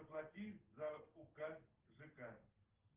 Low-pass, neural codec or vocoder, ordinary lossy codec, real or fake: 3.6 kHz; none; Opus, 16 kbps; real